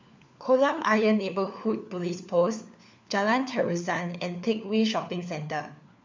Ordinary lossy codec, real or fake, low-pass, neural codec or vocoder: MP3, 64 kbps; fake; 7.2 kHz; codec, 16 kHz, 4 kbps, FunCodec, trained on LibriTTS, 50 frames a second